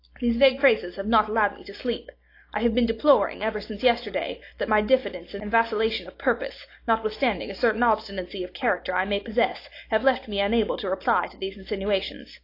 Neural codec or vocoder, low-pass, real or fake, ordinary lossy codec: none; 5.4 kHz; real; AAC, 32 kbps